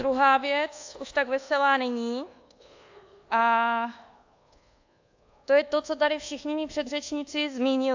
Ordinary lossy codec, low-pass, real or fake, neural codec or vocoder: AAC, 48 kbps; 7.2 kHz; fake; codec, 24 kHz, 1.2 kbps, DualCodec